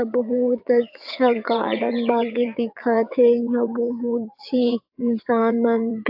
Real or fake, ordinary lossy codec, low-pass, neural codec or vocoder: fake; AAC, 48 kbps; 5.4 kHz; vocoder, 22.05 kHz, 80 mel bands, WaveNeXt